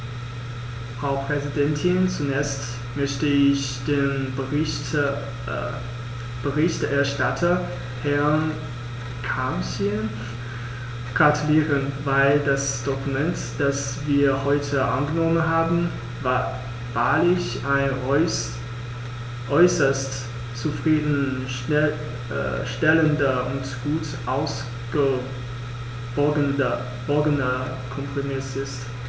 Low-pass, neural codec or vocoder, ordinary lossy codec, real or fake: none; none; none; real